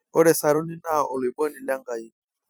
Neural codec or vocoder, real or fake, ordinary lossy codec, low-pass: vocoder, 44.1 kHz, 128 mel bands every 256 samples, BigVGAN v2; fake; none; none